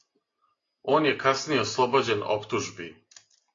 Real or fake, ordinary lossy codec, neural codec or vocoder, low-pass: real; AAC, 32 kbps; none; 7.2 kHz